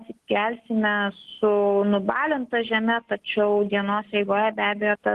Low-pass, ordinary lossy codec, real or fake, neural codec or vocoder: 14.4 kHz; Opus, 16 kbps; real; none